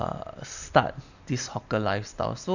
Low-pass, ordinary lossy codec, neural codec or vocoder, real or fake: 7.2 kHz; none; none; real